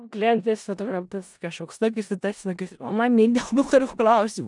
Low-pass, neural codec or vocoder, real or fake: 10.8 kHz; codec, 16 kHz in and 24 kHz out, 0.4 kbps, LongCat-Audio-Codec, four codebook decoder; fake